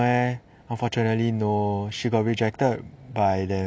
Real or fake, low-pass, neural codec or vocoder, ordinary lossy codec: real; none; none; none